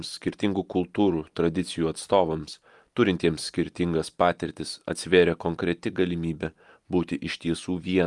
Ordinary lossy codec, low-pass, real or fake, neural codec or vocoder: Opus, 32 kbps; 10.8 kHz; real; none